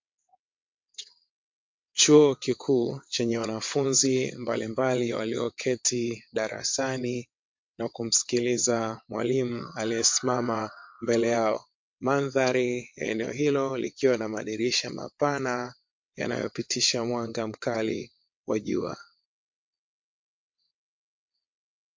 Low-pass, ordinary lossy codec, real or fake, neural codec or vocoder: 7.2 kHz; MP3, 48 kbps; fake; vocoder, 22.05 kHz, 80 mel bands, WaveNeXt